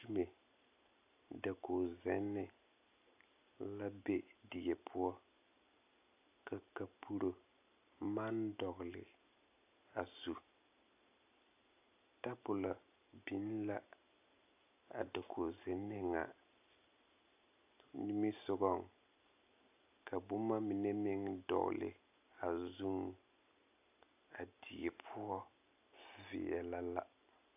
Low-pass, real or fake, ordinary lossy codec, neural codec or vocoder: 3.6 kHz; real; AAC, 32 kbps; none